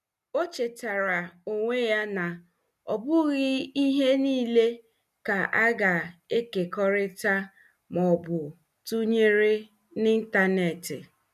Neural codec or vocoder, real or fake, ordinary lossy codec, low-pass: none; real; none; 14.4 kHz